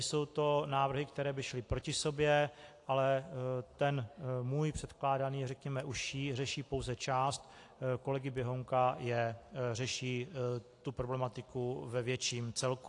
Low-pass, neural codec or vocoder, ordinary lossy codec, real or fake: 10.8 kHz; none; AAC, 48 kbps; real